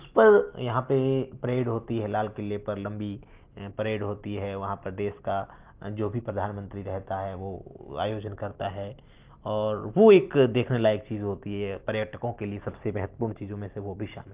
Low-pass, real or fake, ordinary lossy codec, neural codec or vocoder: 3.6 kHz; real; Opus, 24 kbps; none